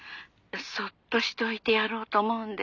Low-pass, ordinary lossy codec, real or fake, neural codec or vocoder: 7.2 kHz; none; real; none